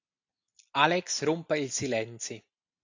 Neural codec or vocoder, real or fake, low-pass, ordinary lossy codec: none; real; 7.2 kHz; AAC, 48 kbps